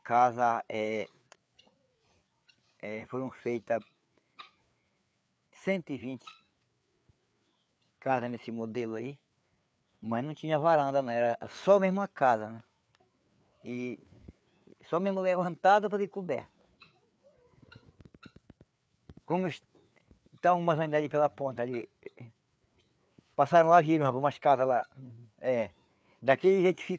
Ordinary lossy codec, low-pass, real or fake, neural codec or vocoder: none; none; fake; codec, 16 kHz, 4 kbps, FreqCodec, larger model